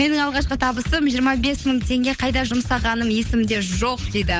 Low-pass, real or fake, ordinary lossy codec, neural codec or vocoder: none; fake; none; codec, 16 kHz, 8 kbps, FunCodec, trained on Chinese and English, 25 frames a second